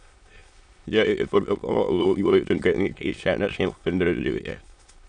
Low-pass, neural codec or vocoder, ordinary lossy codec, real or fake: 9.9 kHz; autoencoder, 22.05 kHz, a latent of 192 numbers a frame, VITS, trained on many speakers; MP3, 96 kbps; fake